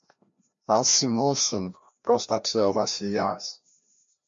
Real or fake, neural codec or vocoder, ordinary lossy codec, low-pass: fake; codec, 16 kHz, 1 kbps, FreqCodec, larger model; MP3, 48 kbps; 7.2 kHz